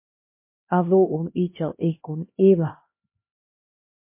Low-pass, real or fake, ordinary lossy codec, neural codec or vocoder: 3.6 kHz; fake; MP3, 24 kbps; codec, 16 kHz, 1 kbps, X-Codec, HuBERT features, trained on LibriSpeech